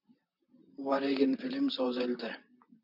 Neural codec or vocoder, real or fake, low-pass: vocoder, 44.1 kHz, 128 mel bands, Pupu-Vocoder; fake; 5.4 kHz